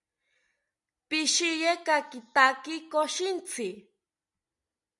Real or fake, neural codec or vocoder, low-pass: real; none; 10.8 kHz